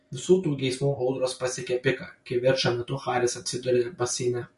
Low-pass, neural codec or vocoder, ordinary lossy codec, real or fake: 14.4 kHz; none; MP3, 48 kbps; real